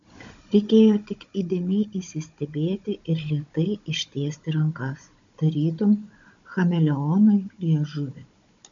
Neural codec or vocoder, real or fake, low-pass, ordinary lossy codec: codec, 16 kHz, 16 kbps, FunCodec, trained on Chinese and English, 50 frames a second; fake; 7.2 kHz; MP3, 64 kbps